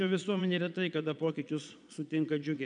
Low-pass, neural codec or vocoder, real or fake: 9.9 kHz; vocoder, 22.05 kHz, 80 mel bands, Vocos; fake